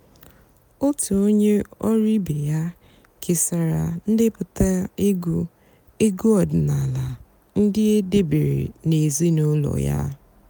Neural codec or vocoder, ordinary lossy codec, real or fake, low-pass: none; none; real; none